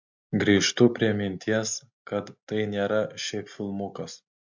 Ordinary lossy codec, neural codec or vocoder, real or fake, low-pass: MP3, 64 kbps; none; real; 7.2 kHz